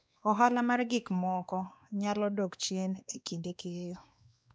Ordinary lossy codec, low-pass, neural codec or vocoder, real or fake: none; none; codec, 16 kHz, 2 kbps, X-Codec, WavLM features, trained on Multilingual LibriSpeech; fake